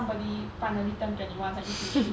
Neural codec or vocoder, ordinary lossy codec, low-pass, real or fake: none; none; none; real